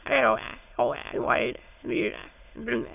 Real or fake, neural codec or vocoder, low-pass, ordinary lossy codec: fake; autoencoder, 22.05 kHz, a latent of 192 numbers a frame, VITS, trained on many speakers; 3.6 kHz; AAC, 32 kbps